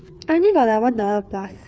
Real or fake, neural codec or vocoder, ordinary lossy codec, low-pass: fake; codec, 16 kHz, 4 kbps, FreqCodec, larger model; none; none